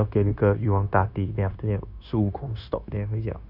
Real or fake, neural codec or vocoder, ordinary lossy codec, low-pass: fake; codec, 16 kHz, 0.9 kbps, LongCat-Audio-Codec; none; 5.4 kHz